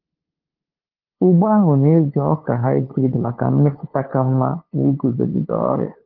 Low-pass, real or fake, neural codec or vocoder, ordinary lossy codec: 5.4 kHz; fake; codec, 16 kHz, 2 kbps, FunCodec, trained on LibriTTS, 25 frames a second; Opus, 16 kbps